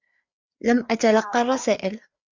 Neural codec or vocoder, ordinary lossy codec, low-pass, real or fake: codec, 16 kHz, 4 kbps, FreqCodec, larger model; MP3, 64 kbps; 7.2 kHz; fake